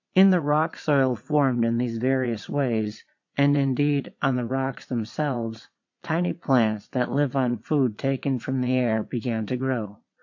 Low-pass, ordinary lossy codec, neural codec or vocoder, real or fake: 7.2 kHz; MP3, 48 kbps; vocoder, 22.05 kHz, 80 mel bands, Vocos; fake